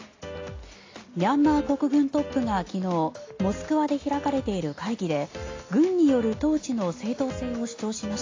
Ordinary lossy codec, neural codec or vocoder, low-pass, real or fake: AAC, 32 kbps; none; 7.2 kHz; real